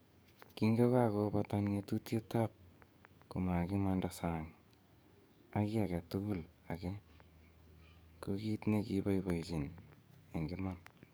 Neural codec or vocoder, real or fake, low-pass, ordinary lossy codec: codec, 44.1 kHz, 7.8 kbps, DAC; fake; none; none